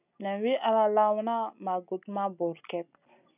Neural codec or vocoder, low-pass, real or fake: none; 3.6 kHz; real